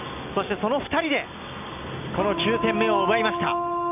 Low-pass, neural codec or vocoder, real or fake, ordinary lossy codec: 3.6 kHz; none; real; none